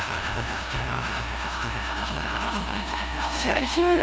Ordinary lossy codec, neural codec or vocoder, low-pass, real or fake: none; codec, 16 kHz, 0.5 kbps, FunCodec, trained on LibriTTS, 25 frames a second; none; fake